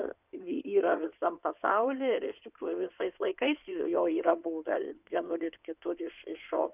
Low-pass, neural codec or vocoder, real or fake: 3.6 kHz; codec, 16 kHz in and 24 kHz out, 2.2 kbps, FireRedTTS-2 codec; fake